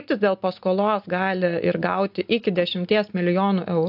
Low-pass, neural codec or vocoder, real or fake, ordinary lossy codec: 5.4 kHz; none; real; AAC, 48 kbps